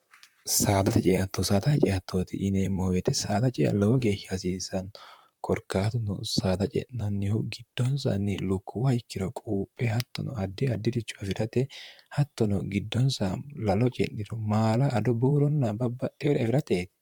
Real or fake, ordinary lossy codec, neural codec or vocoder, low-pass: fake; MP3, 96 kbps; vocoder, 44.1 kHz, 128 mel bands, Pupu-Vocoder; 19.8 kHz